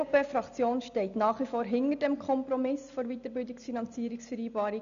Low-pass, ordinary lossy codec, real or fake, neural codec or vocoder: 7.2 kHz; none; real; none